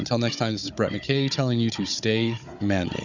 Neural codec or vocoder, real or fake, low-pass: codec, 16 kHz, 16 kbps, FunCodec, trained on Chinese and English, 50 frames a second; fake; 7.2 kHz